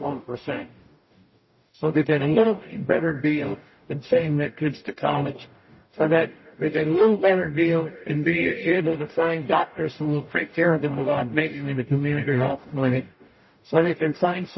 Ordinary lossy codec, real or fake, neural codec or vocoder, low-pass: MP3, 24 kbps; fake; codec, 44.1 kHz, 0.9 kbps, DAC; 7.2 kHz